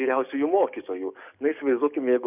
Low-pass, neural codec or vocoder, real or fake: 3.6 kHz; codec, 44.1 kHz, 7.8 kbps, DAC; fake